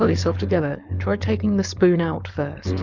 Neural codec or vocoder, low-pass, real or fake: codec, 16 kHz, 4.8 kbps, FACodec; 7.2 kHz; fake